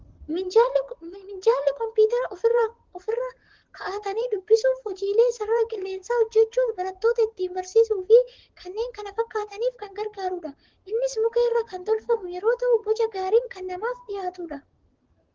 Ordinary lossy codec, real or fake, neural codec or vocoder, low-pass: Opus, 16 kbps; fake; vocoder, 44.1 kHz, 128 mel bands, Pupu-Vocoder; 7.2 kHz